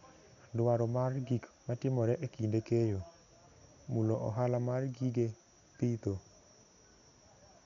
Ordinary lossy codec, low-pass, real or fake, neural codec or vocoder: none; 7.2 kHz; real; none